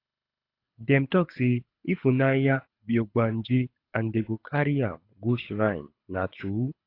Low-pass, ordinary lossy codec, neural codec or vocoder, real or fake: 5.4 kHz; AAC, 32 kbps; codec, 24 kHz, 6 kbps, HILCodec; fake